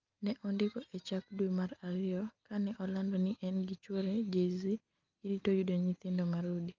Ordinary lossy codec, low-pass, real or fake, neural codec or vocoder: Opus, 24 kbps; 7.2 kHz; real; none